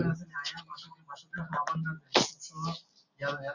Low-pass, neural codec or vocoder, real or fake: 7.2 kHz; none; real